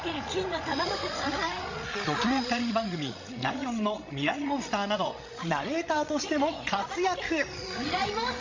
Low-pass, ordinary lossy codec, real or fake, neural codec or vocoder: 7.2 kHz; MP3, 48 kbps; fake; codec, 16 kHz, 16 kbps, FreqCodec, larger model